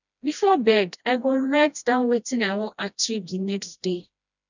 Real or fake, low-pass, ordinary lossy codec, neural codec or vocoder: fake; 7.2 kHz; none; codec, 16 kHz, 1 kbps, FreqCodec, smaller model